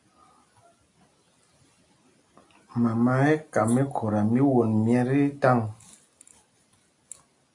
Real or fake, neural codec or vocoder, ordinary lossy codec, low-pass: real; none; AAC, 48 kbps; 10.8 kHz